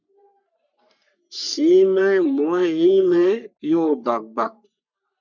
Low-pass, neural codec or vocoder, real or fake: 7.2 kHz; codec, 44.1 kHz, 3.4 kbps, Pupu-Codec; fake